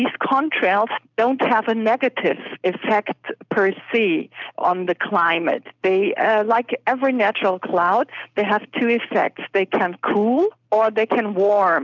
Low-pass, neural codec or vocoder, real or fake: 7.2 kHz; none; real